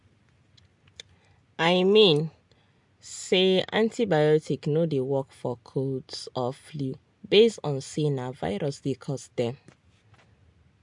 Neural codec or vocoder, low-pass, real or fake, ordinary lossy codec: none; 10.8 kHz; real; MP3, 64 kbps